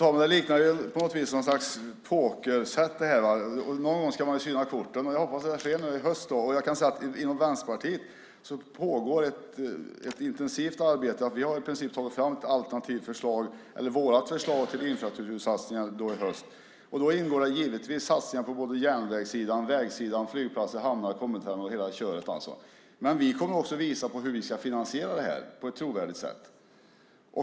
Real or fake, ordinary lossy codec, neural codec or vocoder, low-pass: real; none; none; none